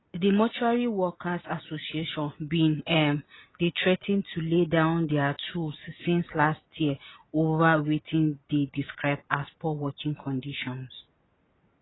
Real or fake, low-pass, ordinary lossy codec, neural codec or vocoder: real; 7.2 kHz; AAC, 16 kbps; none